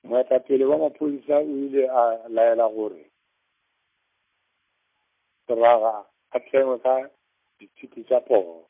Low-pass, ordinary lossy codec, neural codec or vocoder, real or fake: 3.6 kHz; MP3, 32 kbps; none; real